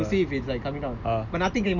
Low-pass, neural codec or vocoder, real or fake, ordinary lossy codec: 7.2 kHz; none; real; none